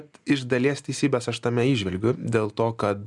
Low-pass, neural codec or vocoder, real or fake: 10.8 kHz; none; real